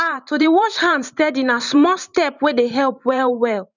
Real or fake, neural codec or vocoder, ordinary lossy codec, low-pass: fake; vocoder, 44.1 kHz, 128 mel bands every 512 samples, BigVGAN v2; none; 7.2 kHz